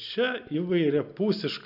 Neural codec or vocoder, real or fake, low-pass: none; real; 5.4 kHz